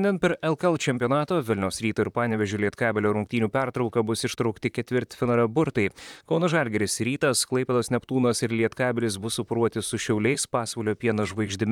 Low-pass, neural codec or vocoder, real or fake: 19.8 kHz; vocoder, 44.1 kHz, 128 mel bands, Pupu-Vocoder; fake